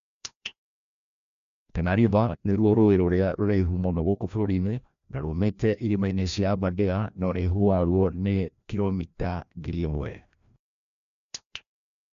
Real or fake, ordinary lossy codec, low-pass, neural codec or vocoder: fake; MP3, 64 kbps; 7.2 kHz; codec, 16 kHz, 1 kbps, FreqCodec, larger model